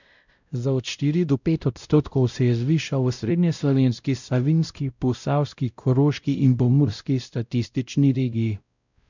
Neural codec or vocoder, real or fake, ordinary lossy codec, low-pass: codec, 16 kHz, 0.5 kbps, X-Codec, WavLM features, trained on Multilingual LibriSpeech; fake; none; 7.2 kHz